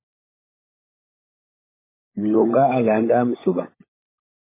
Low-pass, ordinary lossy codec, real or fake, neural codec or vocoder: 3.6 kHz; MP3, 16 kbps; fake; codec, 16 kHz, 16 kbps, FunCodec, trained on LibriTTS, 50 frames a second